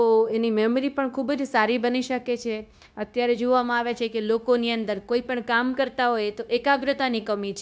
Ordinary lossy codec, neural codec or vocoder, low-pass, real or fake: none; codec, 16 kHz, 0.9 kbps, LongCat-Audio-Codec; none; fake